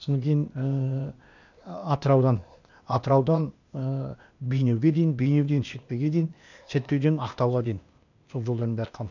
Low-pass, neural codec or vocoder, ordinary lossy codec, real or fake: 7.2 kHz; codec, 16 kHz, 0.8 kbps, ZipCodec; none; fake